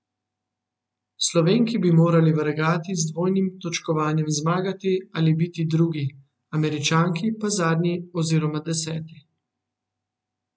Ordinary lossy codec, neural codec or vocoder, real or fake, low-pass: none; none; real; none